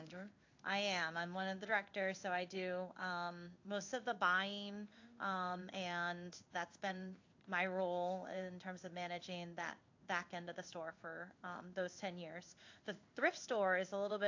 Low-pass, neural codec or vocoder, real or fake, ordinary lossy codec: 7.2 kHz; codec, 16 kHz in and 24 kHz out, 1 kbps, XY-Tokenizer; fake; AAC, 48 kbps